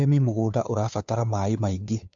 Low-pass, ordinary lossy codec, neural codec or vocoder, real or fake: 7.2 kHz; AAC, 64 kbps; codec, 16 kHz, 2 kbps, FunCodec, trained on Chinese and English, 25 frames a second; fake